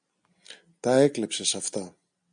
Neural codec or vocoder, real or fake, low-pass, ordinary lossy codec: none; real; 9.9 kHz; MP3, 96 kbps